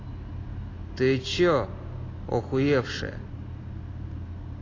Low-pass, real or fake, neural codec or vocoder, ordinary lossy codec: 7.2 kHz; real; none; AAC, 32 kbps